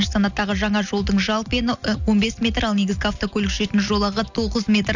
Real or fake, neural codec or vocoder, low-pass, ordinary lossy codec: real; none; 7.2 kHz; none